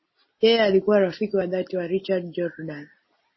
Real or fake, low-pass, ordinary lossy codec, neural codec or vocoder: real; 7.2 kHz; MP3, 24 kbps; none